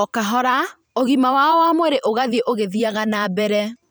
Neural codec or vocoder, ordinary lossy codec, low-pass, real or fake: vocoder, 44.1 kHz, 128 mel bands every 512 samples, BigVGAN v2; none; none; fake